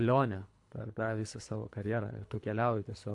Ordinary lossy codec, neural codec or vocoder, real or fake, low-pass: AAC, 64 kbps; codec, 24 kHz, 3 kbps, HILCodec; fake; 10.8 kHz